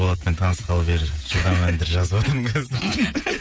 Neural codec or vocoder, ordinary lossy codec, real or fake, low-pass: codec, 16 kHz, 16 kbps, FreqCodec, smaller model; none; fake; none